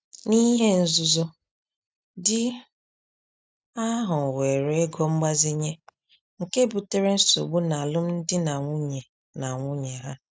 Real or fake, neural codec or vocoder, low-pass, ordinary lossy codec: real; none; none; none